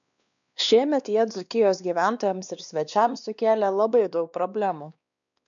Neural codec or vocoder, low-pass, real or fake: codec, 16 kHz, 2 kbps, X-Codec, WavLM features, trained on Multilingual LibriSpeech; 7.2 kHz; fake